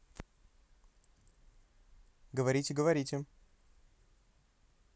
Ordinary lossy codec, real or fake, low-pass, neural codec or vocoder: none; real; none; none